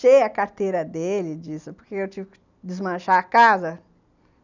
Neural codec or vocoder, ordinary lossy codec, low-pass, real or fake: none; none; 7.2 kHz; real